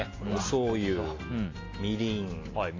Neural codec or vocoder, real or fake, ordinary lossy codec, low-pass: none; real; AAC, 32 kbps; 7.2 kHz